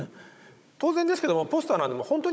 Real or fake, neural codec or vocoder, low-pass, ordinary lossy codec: fake; codec, 16 kHz, 16 kbps, FunCodec, trained on Chinese and English, 50 frames a second; none; none